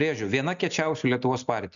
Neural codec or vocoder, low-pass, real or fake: none; 7.2 kHz; real